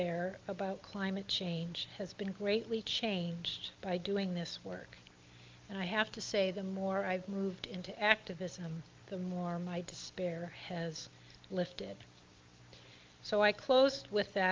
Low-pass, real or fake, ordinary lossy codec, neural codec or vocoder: 7.2 kHz; real; Opus, 24 kbps; none